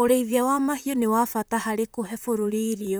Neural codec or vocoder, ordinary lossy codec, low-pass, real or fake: vocoder, 44.1 kHz, 128 mel bands, Pupu-Vocoder; none; none; fake